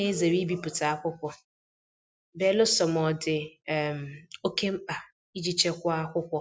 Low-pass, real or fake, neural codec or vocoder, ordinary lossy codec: none; real; none; none